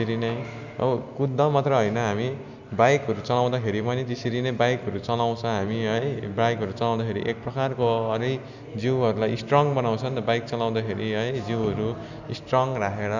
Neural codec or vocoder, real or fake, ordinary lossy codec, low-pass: none; real; none; 7.2 kHz